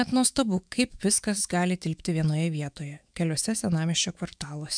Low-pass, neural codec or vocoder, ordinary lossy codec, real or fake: 9.9 kHz; autoencoder, 48 kHz, 128 numbers a frame, DAC-VAE, trained on Japanese speech; MP3, 96 kbps; fake